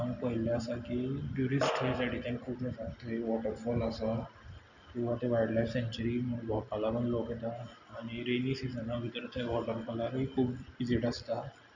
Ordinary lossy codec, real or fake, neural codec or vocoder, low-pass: none; real; none; 7.2 kHz